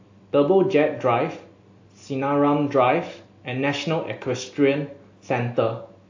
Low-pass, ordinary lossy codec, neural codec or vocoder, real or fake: 7.2 kHz; AAC, 48 kbps; none; real